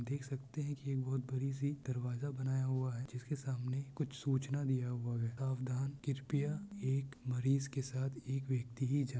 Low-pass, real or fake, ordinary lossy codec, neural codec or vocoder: none; real; none; none